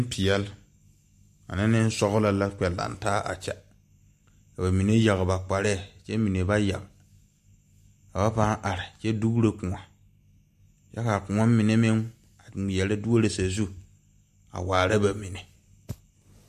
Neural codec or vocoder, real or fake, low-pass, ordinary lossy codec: none; real; 14.4 kHz; MP3, 64 kbps